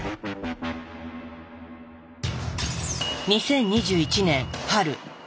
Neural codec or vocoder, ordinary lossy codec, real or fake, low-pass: none; none; real; none